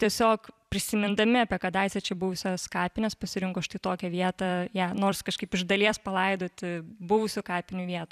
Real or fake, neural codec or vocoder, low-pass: fake; vocoder, 44.1 kHz, 128 mel bands every 256 samples, BigVGAN v2; 14.4 kHz